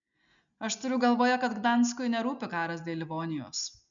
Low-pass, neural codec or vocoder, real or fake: 7.2 kHz; none; real